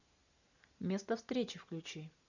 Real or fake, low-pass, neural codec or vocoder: real; 7.2 kHz; none